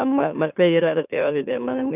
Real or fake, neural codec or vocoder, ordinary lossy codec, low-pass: fake; autoencoder, 44.1 kHz, a latent of 192 numbers a frame, MeloTTS; none; 3.6 kHz